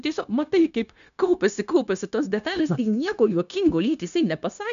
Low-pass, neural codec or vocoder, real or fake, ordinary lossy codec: 7.2 kHz; codec, 16 kHz, 0.9 kbps, LongCat-Audio-Codec; fake; MP3, 96 kbps